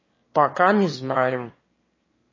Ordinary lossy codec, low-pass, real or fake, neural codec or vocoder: MP3, 32 kbps; 7.2 kHz; fake; autoencoder, 22.05 kHz, a latent of 192 numbers a frame, VITS, trained on one speaker